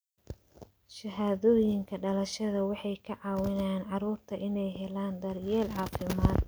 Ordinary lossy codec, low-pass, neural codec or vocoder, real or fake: none; none; none; real